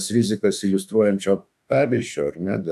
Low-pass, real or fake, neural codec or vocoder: 14.4 kHz; fake; autoencoder, 48 kHz, 32 numbers a frame, DAC-VAE, trained on Japanese speech